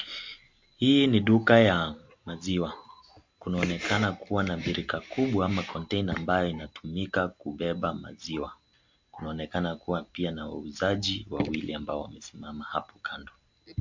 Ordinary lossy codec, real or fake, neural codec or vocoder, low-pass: MP3, 48 kbps; real; none; 7.2 kHz